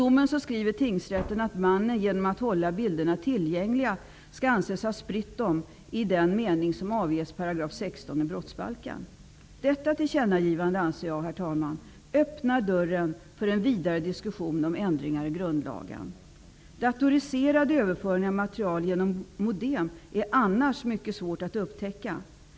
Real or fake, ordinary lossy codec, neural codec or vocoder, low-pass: real; none; none; none